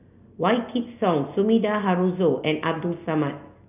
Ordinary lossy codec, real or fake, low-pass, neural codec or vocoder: none; real; 3.6 kHz; none